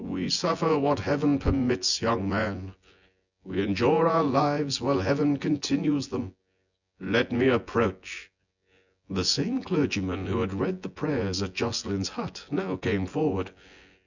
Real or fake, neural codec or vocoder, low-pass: fake; vocoder, 24 kHz, 100 mel bands, Vocos; 7.2 kHz